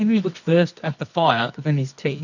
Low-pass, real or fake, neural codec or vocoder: 7.2 kHz; fake; codec, 24 kHz, 0.9 kbps, WavTokenizer, medium music audio release